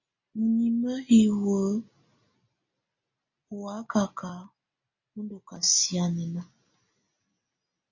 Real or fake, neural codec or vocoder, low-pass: real; none; 7.2 kHz